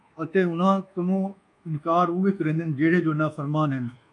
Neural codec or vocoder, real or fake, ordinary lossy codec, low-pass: codec, 24 kHz, 1.2 kbps, DualCodec; fake; MP3, 96 kbps; 10.8 kHz